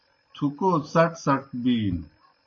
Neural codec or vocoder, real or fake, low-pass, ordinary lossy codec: none; real; 7.2 kHz; MP3, 32 kbps